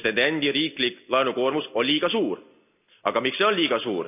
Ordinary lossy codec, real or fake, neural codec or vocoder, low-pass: none; real; none; 3.6 kHz